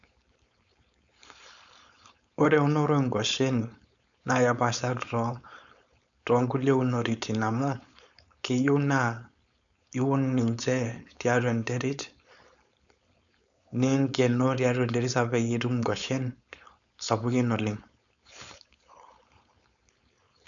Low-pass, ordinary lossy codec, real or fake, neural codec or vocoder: 7.2 kHz; none; fake; codec, 16 kHz, 4.8 kbps, FACodec